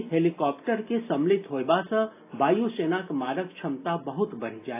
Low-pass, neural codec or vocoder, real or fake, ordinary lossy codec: 3.6 kHz; none; real; AAC, 24 kbps